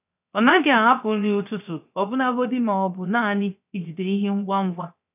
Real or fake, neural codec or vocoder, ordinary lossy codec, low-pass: fake; codec, 16 kHz, 0.7 kbps, FocalCodec; none; 3.6 kHz